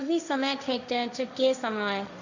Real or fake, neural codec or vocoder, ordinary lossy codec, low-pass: fake; codec, 16 kHz, 1.1 kbps, Voila-Tokenizer; none; 7.2 kHz